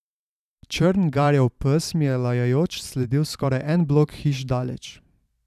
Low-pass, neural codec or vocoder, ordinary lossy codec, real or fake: 14.4 kHz; vocoder, 44.1 kHz, 128 mel bands every 512 samples, BigVGAN v2; none; fake